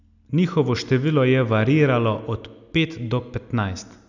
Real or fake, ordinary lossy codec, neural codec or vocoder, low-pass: real; none; none; 7.2 kHz